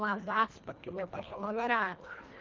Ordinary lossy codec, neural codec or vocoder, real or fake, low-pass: Opus, 32 kbps; codec, 24 kHz, 1.5 kbps, HILCodec; fake; 7.2 kHz